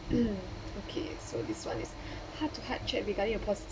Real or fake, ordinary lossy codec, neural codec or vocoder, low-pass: real; none; none; none